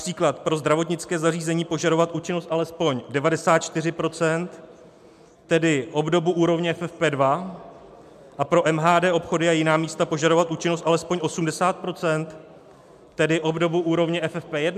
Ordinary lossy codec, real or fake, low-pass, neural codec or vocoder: MP3, 96 kbps; real; 14.4 kHz; none